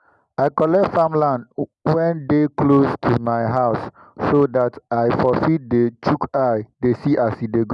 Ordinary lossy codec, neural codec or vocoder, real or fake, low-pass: none; none; real; 10.8 kHz